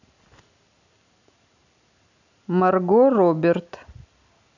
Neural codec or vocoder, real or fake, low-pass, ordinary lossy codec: none; real; 7.2 kHz; none